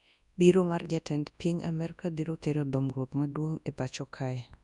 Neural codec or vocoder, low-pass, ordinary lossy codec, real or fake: codec, 24 kHz, 0.9 kbps, WavTokenizer, large speech release; 10.8 kHz; none; fake